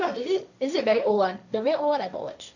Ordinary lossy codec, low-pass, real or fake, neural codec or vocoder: none; 7.2 kHz; fake; codec, 16 kHz, 1.1 kbps, Voila-Tokenizer